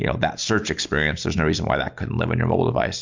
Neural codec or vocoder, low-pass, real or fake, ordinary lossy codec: none; 7.2 kHz; real; MP3, 64 kbps